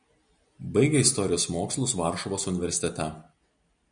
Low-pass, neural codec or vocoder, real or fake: 9.9 kHz; none; real